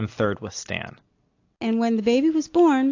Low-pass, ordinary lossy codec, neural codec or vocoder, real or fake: 7.2 kHz; AAC, 48 kbps; none; real